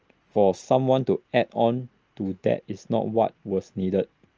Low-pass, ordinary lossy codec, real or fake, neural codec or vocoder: 7.2 kHz; Opus, 24 kbps; real; none